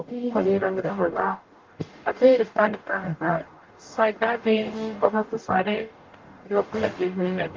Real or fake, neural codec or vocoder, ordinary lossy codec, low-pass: fake; codec, 44.1 kHz, 0.9 kbps, DAC; Opus, 32 kbps; 7.2 kHz